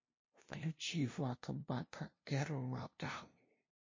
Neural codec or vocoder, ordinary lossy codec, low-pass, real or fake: codec, 16 kHz, 0.5 kbps, FunCodec, trained on LibriTTS, 25 frames a second; MP3, 32 kbps; 7.2 kHz; fake